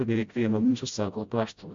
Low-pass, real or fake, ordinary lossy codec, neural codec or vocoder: 7.2 kHz; fake; MP3, 48 kbps; codec, 16 kHz, 0.5 kbps, FreqCodec, smaller model